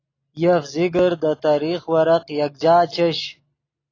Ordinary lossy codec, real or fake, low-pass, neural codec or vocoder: AAC, 32 kbps; real; 7.2 kHz; none